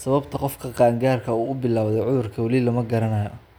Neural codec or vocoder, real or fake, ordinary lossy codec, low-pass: none; real; none; none